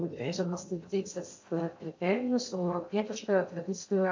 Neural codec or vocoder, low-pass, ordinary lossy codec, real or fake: codec, 16 kHz in and 24 kHz out, 0.6 kbps, FocalCodec, streaming, 2048 codes; 7.2 kHz; MP3, 48 kbps; fake